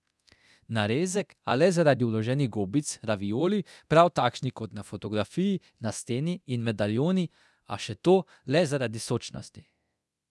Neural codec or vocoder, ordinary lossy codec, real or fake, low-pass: codec, 24 kHz, 0.9 kbps, DualCodec; none; fake; none